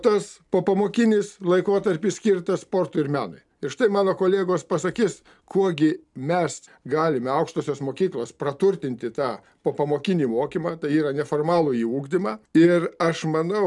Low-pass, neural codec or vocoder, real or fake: 10.8 kHz; none; real